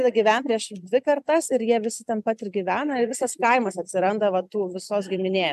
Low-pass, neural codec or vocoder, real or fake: 14.4 kHz; none; real